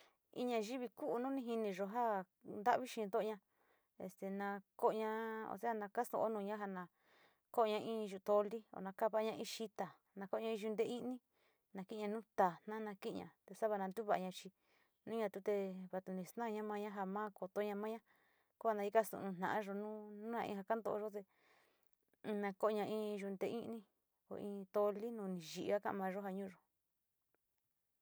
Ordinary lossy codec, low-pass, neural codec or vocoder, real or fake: none; none; none; real